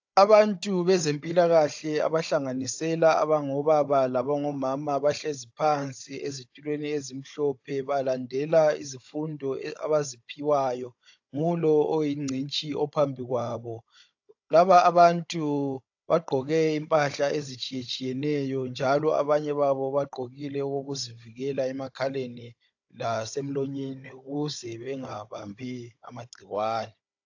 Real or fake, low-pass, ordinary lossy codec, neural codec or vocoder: fake; 7.2 kHz; AAC, 48 kbps; codec, 16 kHz, 16 kbps, FunCodec, trained on Chinese and English, 50 frames a second